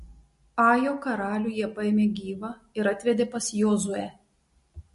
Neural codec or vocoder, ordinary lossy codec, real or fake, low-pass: none; MP3, 48 kbps; real; 14.4 kHz